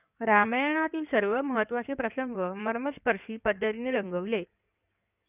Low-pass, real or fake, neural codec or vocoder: 3.6 kHz; fake; codec, 16 kHz in and 24 kHz out, 2.2 kbps, FireRedTTS-2 codec